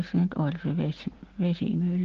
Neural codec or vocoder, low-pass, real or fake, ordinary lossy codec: none; 7.2 kHz; real; Opus, 16 kbps